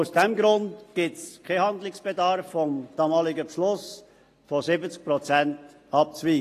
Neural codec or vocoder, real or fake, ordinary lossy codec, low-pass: none; real; AAC, 48 kbps; 14.4 kHz